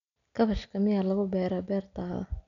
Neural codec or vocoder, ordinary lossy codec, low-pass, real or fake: none; none; 7.2 kHz; real